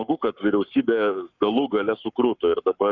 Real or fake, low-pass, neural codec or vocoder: fake; 7.2 kHz; codec, 44.1 kHz, 7.8 kbps, Pupu-Codec